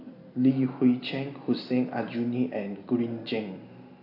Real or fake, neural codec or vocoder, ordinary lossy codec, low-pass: real; none; AAC, 32 kbps; 5.4 kHz